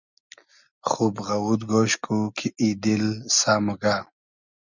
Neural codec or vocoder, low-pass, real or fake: none; 7.2 kHz; real